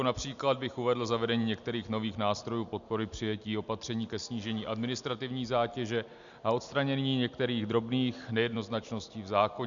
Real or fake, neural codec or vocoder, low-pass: real; none; 7.2 kHz